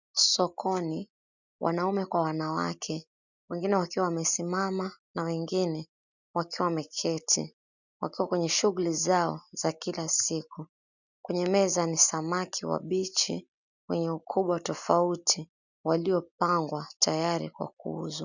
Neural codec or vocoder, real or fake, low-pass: none; real; 7.2 kHz